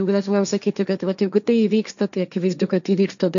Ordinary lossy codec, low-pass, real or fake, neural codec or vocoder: AAC, 96 kbps; 7.2 kHz; fake; codec, 16 kHz, 1.1 kbps, Voila-Tokenizer